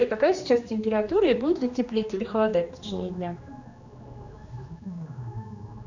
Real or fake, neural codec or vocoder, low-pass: fake; codec, 16 kHz, 2 kbps, X-Codec, HuBERT features, trained on general audio; 7.2 kHz